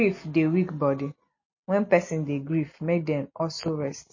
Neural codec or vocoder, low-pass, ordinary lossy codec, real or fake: none; 7.2 kHz; MP3, 32 kbps; real